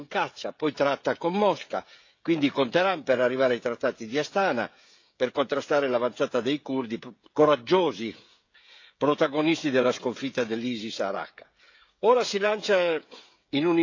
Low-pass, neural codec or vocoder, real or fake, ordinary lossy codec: 7.2 kHz; codec, 16 kHz, 16 kbps, FreqCodec, smaller model; fake; AAC, 48 kbps